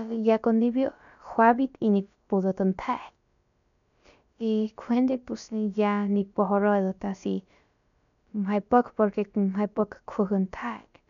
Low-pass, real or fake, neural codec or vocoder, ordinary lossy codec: 7.2 kHz; fake; codec, 16 kHz, about 1 kbps, DyCAST, with the encoder's durations; MP3, 96 kbps